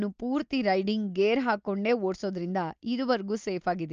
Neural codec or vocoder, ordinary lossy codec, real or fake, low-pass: none; Opus, 24 kbps; real; 7.2 kHz